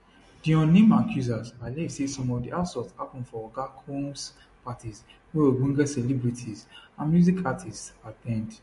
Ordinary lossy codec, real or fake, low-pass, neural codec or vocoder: MP3, 48 kbps; real; 14.4 kHz; none